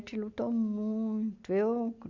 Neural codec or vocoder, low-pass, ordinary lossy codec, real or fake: none; 7.2 kHz; MP3, 64 kbps; real